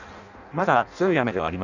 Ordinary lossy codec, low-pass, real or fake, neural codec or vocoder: none; 7.2 kHz; fake; codec, 16 kHz in and 24 kHz out, 0.6 kbps, FireRedTTS-2 codec